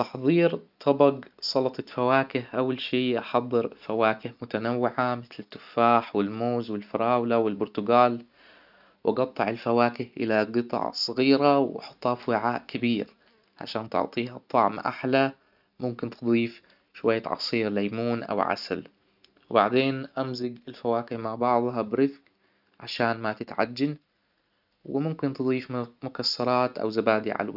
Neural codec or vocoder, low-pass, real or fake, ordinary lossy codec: none; 5.4 kHz; real; none